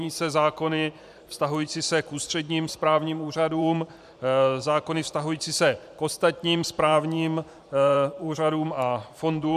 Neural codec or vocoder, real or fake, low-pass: vocoder, 44.1 kHz, 128 mel bands every 512 samples, BigVGAN v2; fake; 14.4 kHz